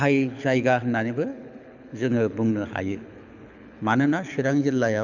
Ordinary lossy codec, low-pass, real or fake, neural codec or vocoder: none; 7.2 kHz; fake; codec, 24 kHz, 6 kbps, HILCodec